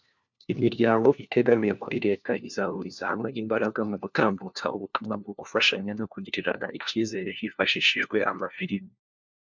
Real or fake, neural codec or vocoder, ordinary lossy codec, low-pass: fake; codec, 16 kHz, 1 kbps, FunCodec, trained on LibriTTS, 50 frames a second; MP3, 64 kbps; 7.2 kHz